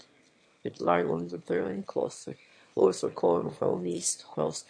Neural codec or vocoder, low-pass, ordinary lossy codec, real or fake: autoencoder, 22.05 kHz, a latent of 192 numbers a frame, VITS, trained on one speaker; 9.9 kHz; MP3, 48 kbps; fake